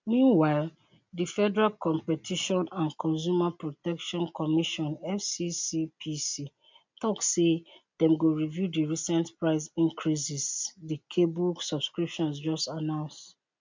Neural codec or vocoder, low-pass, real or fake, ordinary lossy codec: none; 7.2 kHz; real; MP3, 64 kbps